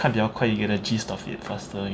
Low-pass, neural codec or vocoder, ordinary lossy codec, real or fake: none; none; none; real